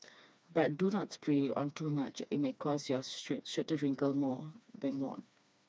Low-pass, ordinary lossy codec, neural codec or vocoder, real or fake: none; none; codec, 16 kHz, 2 kbps, FreqCodec, smaller model; fake